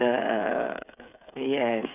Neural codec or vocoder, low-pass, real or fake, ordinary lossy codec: codec, 16 kHz, 8 kbps, FreqCodec, smaller model; 3.6 kHz; fake; none